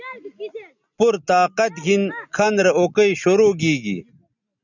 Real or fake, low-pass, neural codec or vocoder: real; 7.2 kHz; none